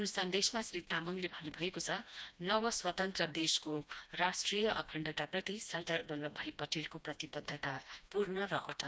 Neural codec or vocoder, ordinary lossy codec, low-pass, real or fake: codec, 16 kHz, 1 kbps, FreqCodec, smaller model; none; none; fake